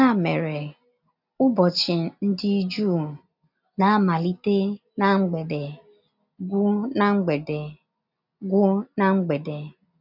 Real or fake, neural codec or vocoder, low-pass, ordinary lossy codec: real; none; 5.4 kHz; none